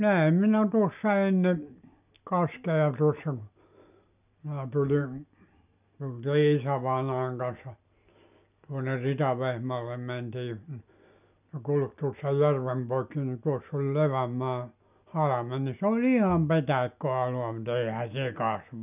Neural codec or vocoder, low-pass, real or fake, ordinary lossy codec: none; 3.6 kHz; real; none